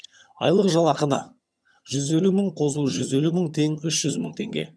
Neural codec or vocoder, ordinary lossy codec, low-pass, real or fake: vocoder, 22.05 kHz, 80 mel bands, HiFi-GAN; none; none; fake